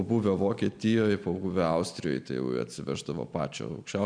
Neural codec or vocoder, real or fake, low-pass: none; real; 9.9 kHz